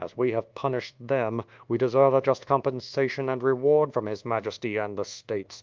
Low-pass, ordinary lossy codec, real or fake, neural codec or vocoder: 7.2 kHz; Opus, 32 kbps; fake; codec, 24 kHz, 1.2 kbps, DualCodec